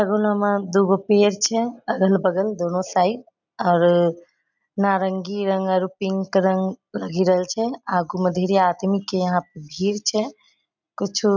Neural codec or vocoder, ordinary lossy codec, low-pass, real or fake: none; none; 7.2 kHz; real